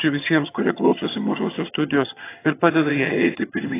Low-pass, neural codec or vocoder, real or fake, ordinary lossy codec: 3.6 kHz; vocoder, 22.05 kHz, 80 mel bands, HiFi-GAN; fake; AAC, 16 kbps